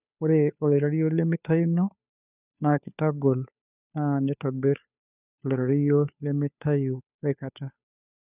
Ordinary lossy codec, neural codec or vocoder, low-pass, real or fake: none; codec, 16 kHz, 2 kbps, FunCodec, trained on Chinese and English, 25 frames a second; 3.6 kHz; fake